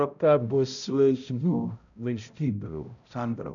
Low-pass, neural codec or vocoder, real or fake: 7.2 kHz; codec, 16 kHz, 0.5 kbps, X-Codec, HuBERT features, trained on balanced general audio; fake